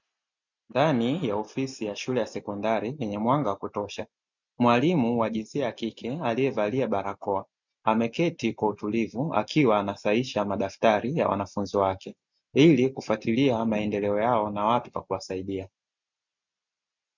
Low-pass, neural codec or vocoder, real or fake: 7.2 kHz; none; real